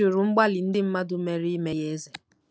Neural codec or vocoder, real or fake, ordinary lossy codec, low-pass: none; real; none; none